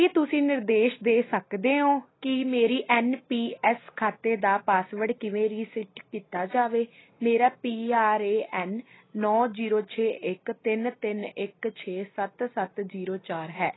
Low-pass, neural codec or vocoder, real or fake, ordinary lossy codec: 7.2 kHz; none; real; AAC, 16 kbps